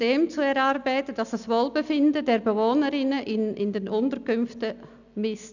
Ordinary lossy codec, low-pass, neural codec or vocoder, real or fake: none; 7.2 kHz; none; real